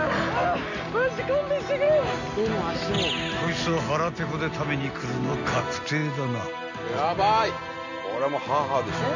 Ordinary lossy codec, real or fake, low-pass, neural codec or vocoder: none; real; 7.2 kHz; none